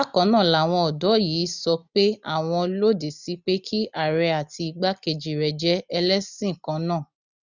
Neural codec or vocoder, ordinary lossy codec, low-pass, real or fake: none; none; 7.2 kHz; real